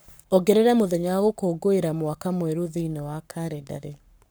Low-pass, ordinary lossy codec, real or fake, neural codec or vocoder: none; none; fake; codec, 44.1 kHz, 7.8 kbps, Pupu-Codec